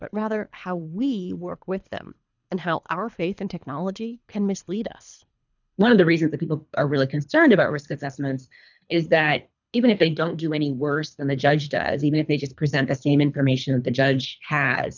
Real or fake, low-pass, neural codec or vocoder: fake; 7.2 kHz; codec, 24 kHz, 3 kbps, HILCodec